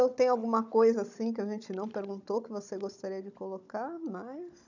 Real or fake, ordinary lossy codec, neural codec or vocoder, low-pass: fake; none; codec, 16 kHz, 16 kbps, FunCodec, trained on Chinese and English, 50 frames a second; 7.2 kHz